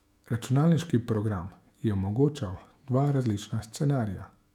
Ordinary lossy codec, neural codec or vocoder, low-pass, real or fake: none; autoencoder, 48 kHz, 128 numbers a frame, DAC-VAE, trained on Japanese speech; 19.8 kHz; fake